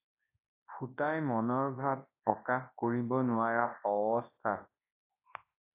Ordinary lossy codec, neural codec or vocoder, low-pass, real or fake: AAC, 24 kbps; codec, 24 kHz, 0.9 kbps, WavTokenizer, large speech release; 3.6 kHz; fake